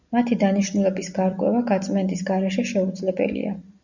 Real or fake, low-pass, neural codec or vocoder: real; 7.2 kHz; none